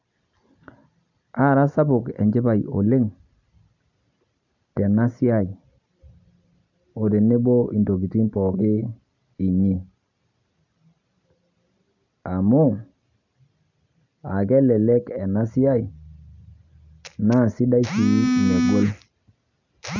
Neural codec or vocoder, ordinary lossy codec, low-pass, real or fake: none; none; 7.2 kHz; real